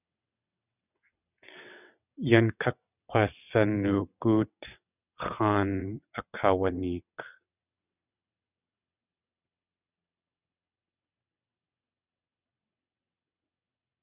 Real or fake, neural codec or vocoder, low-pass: fake; vocoder, 22.05 kHz, 80 mel bands, WaveNeXt; 3.6 kHz